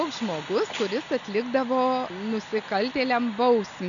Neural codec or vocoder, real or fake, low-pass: none; real; 7.2 kHz